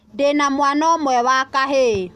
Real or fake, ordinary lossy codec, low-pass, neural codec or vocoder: real; none; 14.4 kHz; none